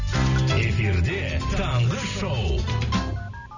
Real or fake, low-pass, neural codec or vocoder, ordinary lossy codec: real; 7.2 kHz; none; none